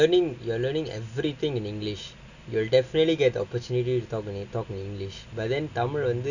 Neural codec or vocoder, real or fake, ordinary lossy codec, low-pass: none; real; none; 7.2 kHz